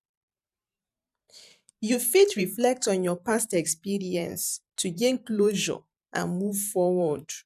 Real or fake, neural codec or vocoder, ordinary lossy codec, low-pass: fake; vocoder, 44.1 kHz, 128 mel bands every 512 samples, BigVGAN v2; none; 14.4 kHz